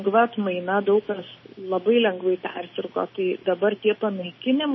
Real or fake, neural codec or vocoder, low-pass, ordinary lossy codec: real; none; 7.2 kHz; MP3, 24 kbps